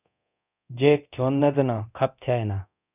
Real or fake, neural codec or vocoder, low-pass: fake; codec, 24 kHz, 0.9 kbps, DualCodec; 3.6 kHz